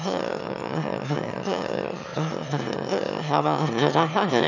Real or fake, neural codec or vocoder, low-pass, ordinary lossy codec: fake; autoencoder, 22.05 kHz, a latent of 192 numbers a frame, VITS, trained on one speaker; 7.2 kHz; none